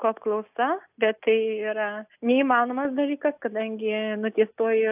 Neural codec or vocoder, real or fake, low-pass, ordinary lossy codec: none; real; 3.6 kHz; AAC, 32 kbps